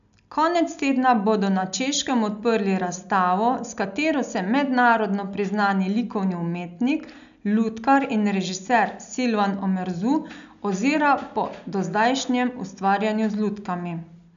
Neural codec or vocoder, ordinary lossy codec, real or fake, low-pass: none; none; real; 7.2 kHz